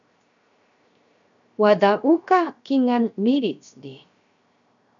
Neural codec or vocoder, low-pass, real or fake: codec, 16 kHz, 0.7 kbps, FocalCodec; 7.2 kHz; fake